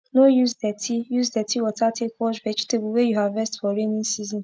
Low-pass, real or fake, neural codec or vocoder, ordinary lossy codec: none; real; none; none